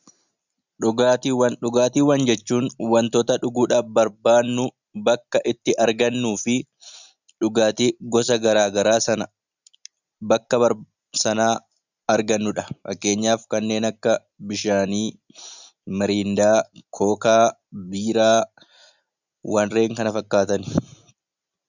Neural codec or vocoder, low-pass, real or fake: none; 7.2 kHz; real